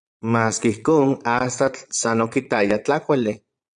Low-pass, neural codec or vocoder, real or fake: 9.9 kHz; vocoder, 22.05 kHz, 80 mel bands, Vocos; fake